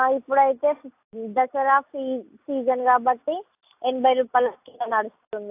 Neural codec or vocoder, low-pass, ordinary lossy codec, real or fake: none; 3.6 kHz; AAC, 32 kbps; real